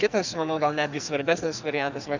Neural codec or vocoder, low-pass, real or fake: codec, 44.1 kHz, 2.6 kbps, SNAC; 7.2 kHz; fake